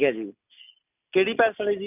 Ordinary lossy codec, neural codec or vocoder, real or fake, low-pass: none; none; real; 3.6 kHz